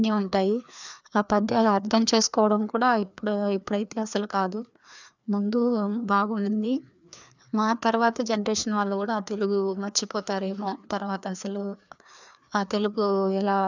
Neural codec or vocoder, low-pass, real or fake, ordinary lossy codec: codec, 16 kHz, 2 kbps, FreqCodec, larger model; 7.2 kHz; fake; none